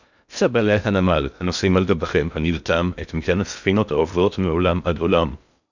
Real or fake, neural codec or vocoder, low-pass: fake; codec, 16 kHz in and 24 kHz out, 0.6 kbps, FocalCodec, streaming, 2048 codes; 7.2 kHz